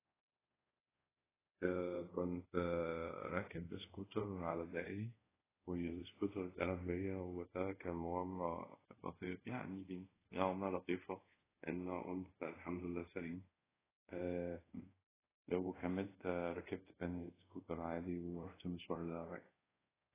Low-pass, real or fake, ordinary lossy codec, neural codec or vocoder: 3.6 kHz; fake; AAC, 16 kbps; codec, 24 kHz, 0.5 kbps, DualCodec